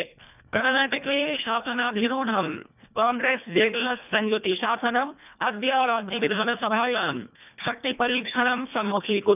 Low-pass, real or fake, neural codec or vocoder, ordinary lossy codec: 3.6 kHz; fake; codec, 24 kHz, 1.5 kbps, HILCodec; none